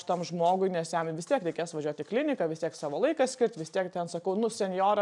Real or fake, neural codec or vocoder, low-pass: real; none; 10.8 kHz